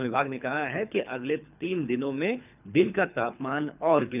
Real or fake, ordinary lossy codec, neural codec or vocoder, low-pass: fake; none; codec, 24 kHz, 3 kbps, HILCodec; 3.6 kHz